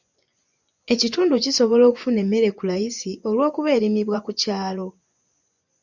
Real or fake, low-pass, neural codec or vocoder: fake; 7.2 kHz; vocoder, 24 kHz, 100 mel bands, Vocos